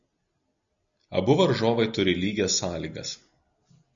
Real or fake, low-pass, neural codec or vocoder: real; 7.2 kHz; none